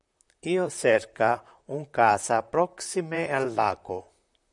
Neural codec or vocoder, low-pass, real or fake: vocoder, 44.1 kHz, 128 mel bands, Pupu-Vocoder; 10.8 kHz; fake